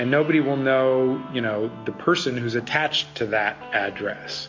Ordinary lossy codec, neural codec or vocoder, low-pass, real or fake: MP3, 48 kbps; none; 7.2 kHz; real